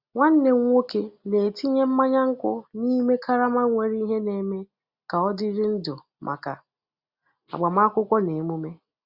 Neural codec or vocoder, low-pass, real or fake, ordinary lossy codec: none; 5.4 kHz; real; Opus, 64 kbps